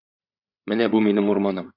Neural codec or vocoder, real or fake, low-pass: codec, 16 kHz, 8 kbps, FreqCodec, larger model; fake; 5.4 kHz